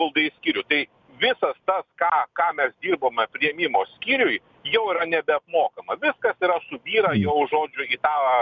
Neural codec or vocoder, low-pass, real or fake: none; 7.2 kHz; real